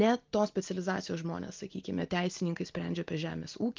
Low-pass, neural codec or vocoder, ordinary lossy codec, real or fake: 7.2 kHz; none; Opus, 32 kbps; real